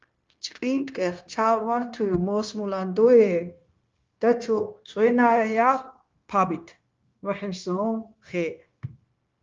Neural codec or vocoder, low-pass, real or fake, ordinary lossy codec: codec, 16 kHz, 0.9 kbps, LongCat-Audio-Codec; 7.2 kHz; fake; Opus, 24 kbps